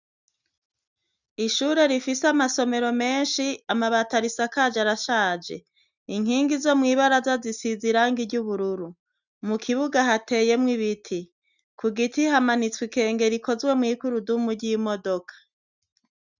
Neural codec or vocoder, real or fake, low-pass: none; real; 7.2 kHz